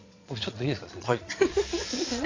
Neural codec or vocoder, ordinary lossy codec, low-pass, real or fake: vocoder, 22.05 kHz, 80 mel bands, Vocos; AAC, 32 kbps; 7.2 kHz; fake